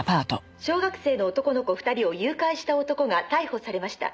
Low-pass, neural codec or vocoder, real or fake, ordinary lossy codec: none; none; real; none